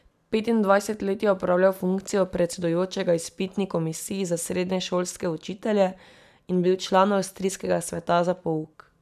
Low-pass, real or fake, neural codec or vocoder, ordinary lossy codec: 14.4 kHz; real; none; none